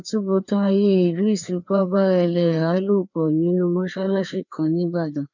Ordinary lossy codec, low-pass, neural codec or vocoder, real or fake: none; 7.2 kHz; codec, 16 kHz, 2 kbps, FreqCodec, larger model; fake